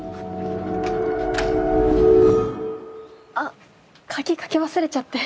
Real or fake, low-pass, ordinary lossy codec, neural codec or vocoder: real; none; none; none